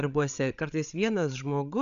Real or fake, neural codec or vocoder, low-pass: fake; codec, 16 kHz, 8 kbps, FreqCodec, larger model; 7.2 kHz